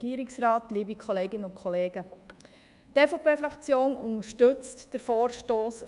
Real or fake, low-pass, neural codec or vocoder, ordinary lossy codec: fake; 10.8 kHz; codec, 24 kHz, 1.2 kbps, DualCodec; none